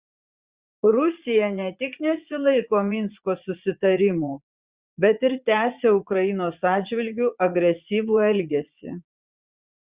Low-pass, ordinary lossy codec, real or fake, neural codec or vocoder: 3.6 kHz; Opus, 64 kbps; fake; vocoder, 44.1 kHz, 80 mel bands, Vocos